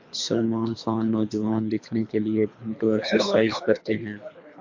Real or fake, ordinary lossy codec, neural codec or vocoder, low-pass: fake; MP3, 48 kbps; codec, 24 kHz, 3 kbps, HILCodec; 7.2 kHz